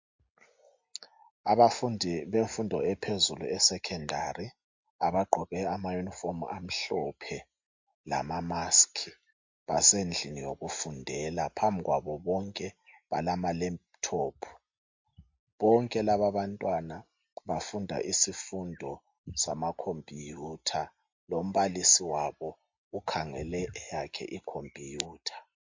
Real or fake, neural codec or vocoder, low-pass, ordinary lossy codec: real; none; 7.2 kHz; MP3, 48 kbps